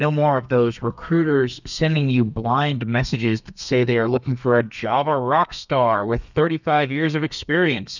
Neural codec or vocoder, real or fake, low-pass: codec, 32 kHz, 1.9 kbps, SNAC; fake; 7.2 kHz